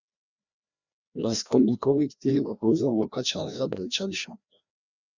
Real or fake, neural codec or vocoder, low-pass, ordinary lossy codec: fake; codec, 16 kHz, 1 kbps, FreqCodec, larger model; 7.2 kHz; Opus, 64 kbps